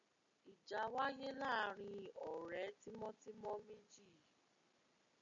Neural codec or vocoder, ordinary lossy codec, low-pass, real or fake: none; MP3, 64 kbps; 7.2 kHz; real